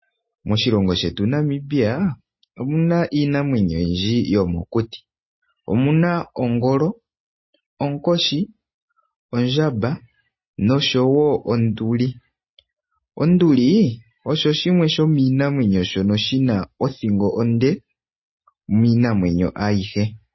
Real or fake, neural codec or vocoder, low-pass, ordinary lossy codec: real; none; 7.2 kHz; MP3, 24 kbps